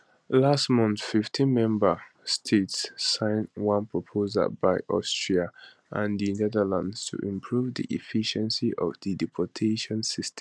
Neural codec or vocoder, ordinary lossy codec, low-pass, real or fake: none; none; none; real